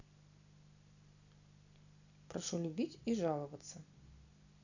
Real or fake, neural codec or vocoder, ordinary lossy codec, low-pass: real; none; none; 7.2 kHz